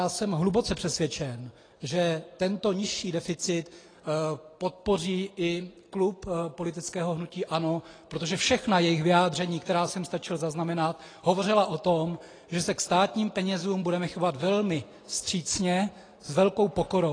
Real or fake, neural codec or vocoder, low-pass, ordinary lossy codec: real; none; 9.9 kHz; AAC, 32 kbps